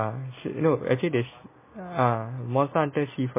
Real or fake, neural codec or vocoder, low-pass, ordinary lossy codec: real; none; 3.6 kHz; MP3, 16 kbps